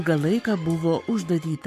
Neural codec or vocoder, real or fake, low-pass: codec, 44.1 kHz, 7.8 kbps, Pupu-Codec; fake; 14.4 kHz